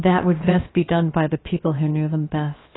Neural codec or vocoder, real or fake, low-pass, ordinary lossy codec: codec, 16 kHz, 0.7 kbps, FocalCodec; fake; 7.2 kHz; AAC, 16 kbps